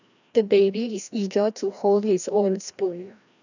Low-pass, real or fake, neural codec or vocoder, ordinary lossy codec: 7.2 kHz; fake; codec, 16 kHz, 1 kbps, FreqCodec, larger model; none